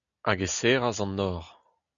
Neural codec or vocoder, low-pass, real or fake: none; 7.2 kHz; real